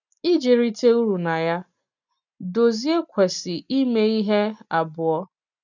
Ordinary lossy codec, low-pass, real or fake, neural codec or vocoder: none; 7.2 kHz; real; none